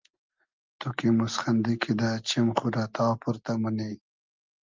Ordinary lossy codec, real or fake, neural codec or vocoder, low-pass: Opus, 24 kbps; real; none; 7.2 kHz